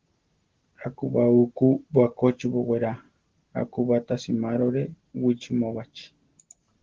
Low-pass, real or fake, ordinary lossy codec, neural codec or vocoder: 7.2 kHz; real; Opus, 16 kbps; none